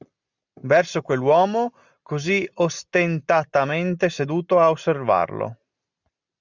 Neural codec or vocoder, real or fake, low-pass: none; real; 7.2 kHz